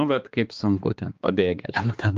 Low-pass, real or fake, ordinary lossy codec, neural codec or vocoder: 7.2 kHz; fake; Opus, 32 kbps; codec, 16 kHz, 2 kbps, X-Codec, HuBERT features, trained on balanced general audio